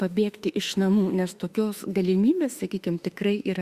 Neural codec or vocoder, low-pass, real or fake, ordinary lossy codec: autoencoder, 48 kHz, 32 numbers a frame, DAC-VAE, trained on Japanese speech; 14.4 kHz; fake; Opus, 64 kbps